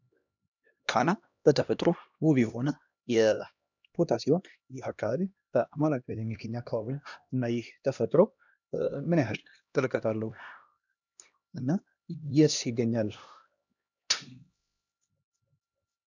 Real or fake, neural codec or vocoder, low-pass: fake; codec, 16 kHz, 1 kbps, X-Codec, HuBERT features, trained on LibriSpeech; 7.2 kHz